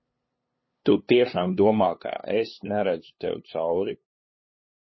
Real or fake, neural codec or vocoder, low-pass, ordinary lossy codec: fake; codec, 16 kHz, 2 kbps, FunCodec, trained on LibriTTS, 25 frames a second; 7.2 kHz; MP3, 24 kbps